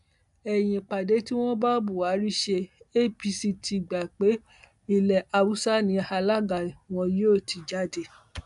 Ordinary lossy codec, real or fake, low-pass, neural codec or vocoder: none; real; 10.8 kHz; none